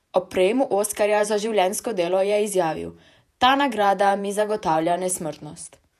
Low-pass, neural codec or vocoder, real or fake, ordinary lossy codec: 14.4 kHz; vocoder, 48 kHz, 128 mel bands, Vocos; fake; none